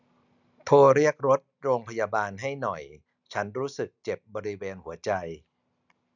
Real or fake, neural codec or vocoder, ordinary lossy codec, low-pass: real; none; none; 7.2 kHz